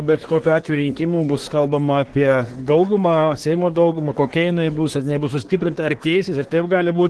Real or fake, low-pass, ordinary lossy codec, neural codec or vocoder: fake; 10.8 kHz; Opus, 16 kbps; codec, 24 kHz, 1 kbps, SNAC